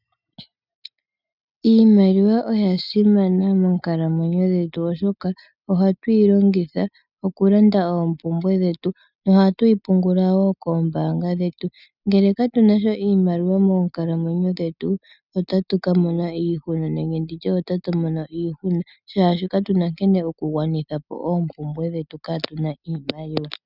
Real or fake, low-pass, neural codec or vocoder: real; 5.4 kHz; none